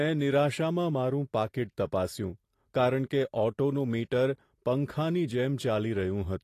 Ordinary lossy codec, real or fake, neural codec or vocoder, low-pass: AAC, 48 kbps; real; none; 14.4 kHz